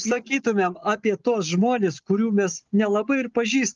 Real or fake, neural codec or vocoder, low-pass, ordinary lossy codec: real; none; 7.2 kHz; Opus, 32 kbps